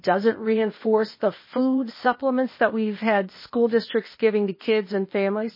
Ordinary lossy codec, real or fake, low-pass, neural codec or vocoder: MP3, 24 kbps; fake; 5.4 kHz; codec, 16 kHz, 0.8 kbps, ZipCodec